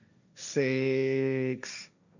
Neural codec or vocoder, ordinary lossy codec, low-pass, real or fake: codec, 16 kHz, 1.1 kbps, Voila-Tokenizer; none; 7.2 kHz; fake